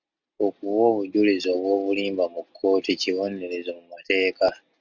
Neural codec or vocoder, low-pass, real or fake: none; 7.2 kHz; real